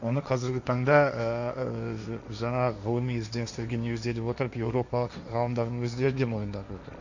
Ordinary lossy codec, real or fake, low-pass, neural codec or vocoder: none; fake; 7.2 kHz; codec, 16 kHz, 1.1 kbps, Voila-Tokenizer